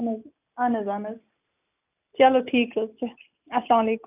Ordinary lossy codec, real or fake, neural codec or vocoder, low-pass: none; real; none; 3.6 kHz